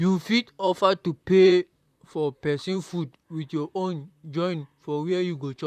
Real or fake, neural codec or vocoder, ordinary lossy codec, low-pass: fake; vocoder, 44.1 kHz, 128 mel bands, Pupu-Vocoder; none; 14.4 kHz